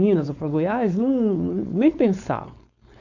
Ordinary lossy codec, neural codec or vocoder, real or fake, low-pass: none; codec, 16 kHz, 4.8 kbps, FACodec; fake; 7.2 kHz